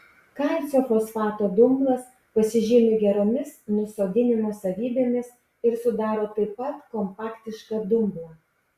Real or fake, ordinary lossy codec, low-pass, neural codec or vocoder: real; Opus, 64 kbps; 14.4 kHz; none